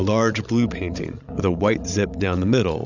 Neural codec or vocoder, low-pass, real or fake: codec, 16 kHz, 16 kbps, FreqCodec, larger model; 7.2 kHz; fake